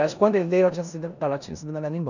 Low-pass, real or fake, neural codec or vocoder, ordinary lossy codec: 7.2 kHz; fake; codec, 16 kHz in and 24 kHz out, 0.9 kbps, LongCat-Audio-Codec, four codebook decoder; none